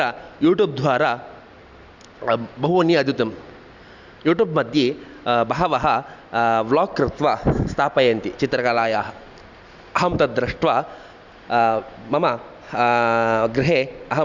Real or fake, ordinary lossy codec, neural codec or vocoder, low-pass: real; Opus, 64 kbps; none; 7.2 kHz